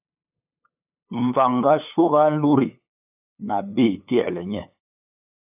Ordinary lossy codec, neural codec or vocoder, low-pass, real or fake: AAC, 32 kbps; codec, 16 kHz, 8 kbps, FunCodec, trained on LibriTTS, 25 frames a second; 3.6 kHz; fake